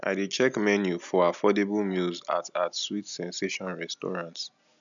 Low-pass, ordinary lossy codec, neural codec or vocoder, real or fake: 7.2 kHz; none; none; real